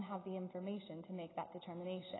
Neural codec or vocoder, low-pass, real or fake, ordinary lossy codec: none; 7.2 kHz; real; AAC, 16 kbps